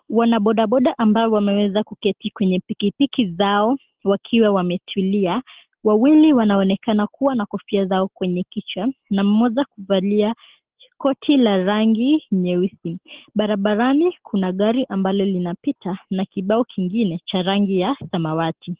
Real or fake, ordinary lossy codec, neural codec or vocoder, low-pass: real; Opus, 16 kbps; none; 3.6 kHz